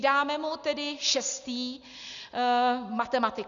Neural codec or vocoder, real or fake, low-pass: none; real; 7.2 kHz